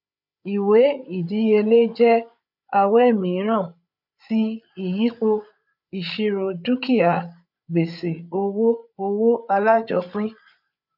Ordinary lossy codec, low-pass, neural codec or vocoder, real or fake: none; 5.4 kHz; codec, 16 kHz, 8 kbps, FreqCodec, larger model; fake